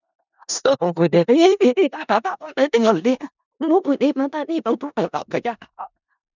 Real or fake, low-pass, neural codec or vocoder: fake; 7.2 kHz; codec, 16 kHz in and 24 kHz out, 0.4 kbps, LongCat-Audio-Codec, four codebook decoder